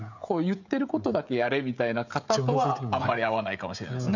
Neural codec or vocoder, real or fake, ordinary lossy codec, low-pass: codec, 16 kHz, 16 kbps, FreqCodec, smaller model; fake; none; 7.2 kHz